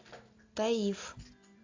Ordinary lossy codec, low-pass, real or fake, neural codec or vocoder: AAC, 48 kbps; 7.2 kHz; real; none